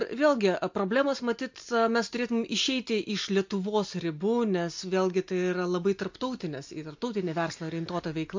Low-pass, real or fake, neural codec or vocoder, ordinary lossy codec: 7.2 kHz; real; none; MP3, 48 kbps